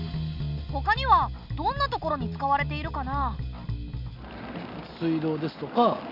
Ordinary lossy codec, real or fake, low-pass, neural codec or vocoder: none; real; 5.4 kHz; none